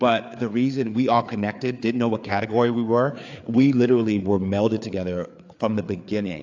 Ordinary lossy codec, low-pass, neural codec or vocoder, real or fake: AAC, 48 kbps; 7.2 kHz; codec, 16 kHz, 4 kbps, FreqCodec, larger model; fake